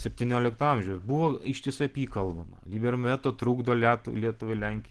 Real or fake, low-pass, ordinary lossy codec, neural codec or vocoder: real; 10.8 kHz; Opus, 16 kbps; none